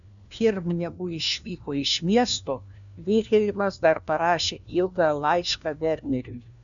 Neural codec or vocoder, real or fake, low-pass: codec, 16 kHz, 1 kbps, FunCodec, trained on LibriTTS, 50 frames a second; fake; 7.2 kHz